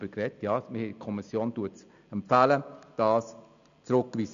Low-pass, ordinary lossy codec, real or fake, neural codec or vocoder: 7.2 kHz; none; real; none